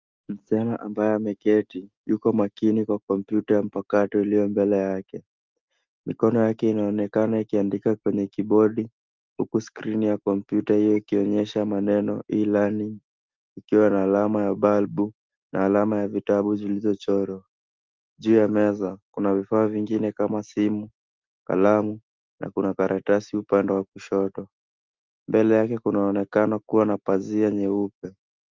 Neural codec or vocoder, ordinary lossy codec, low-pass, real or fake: none; Opus, 32 kbps; 7.2 kHz; real